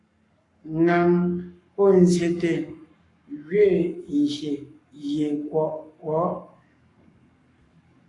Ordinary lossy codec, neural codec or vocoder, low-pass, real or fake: AAC, 48 kbps; codec, 44.1 kHz, 7.8 kbps, Pupu-Codec; 10.8 kHz; fake